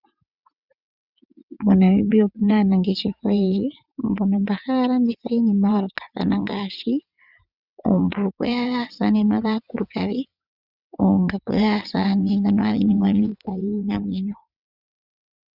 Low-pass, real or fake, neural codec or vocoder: 5.4 kHz; fake; vocoder, 22.05 kHz, 80 mel bands, WaveNeXt